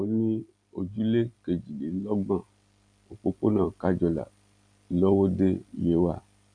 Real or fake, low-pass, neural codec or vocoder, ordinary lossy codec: real; 9.9 kHz; none; none